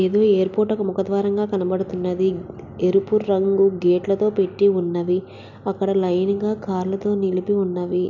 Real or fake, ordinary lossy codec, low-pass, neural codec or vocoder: real; none; 7.2 kHz; none